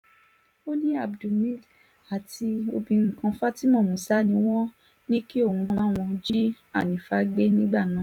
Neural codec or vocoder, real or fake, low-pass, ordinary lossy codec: vocoder, 44.1 kHz, 128 mel bands every 256 samples, BigVGAN v2; fake; 19.8 kHz; none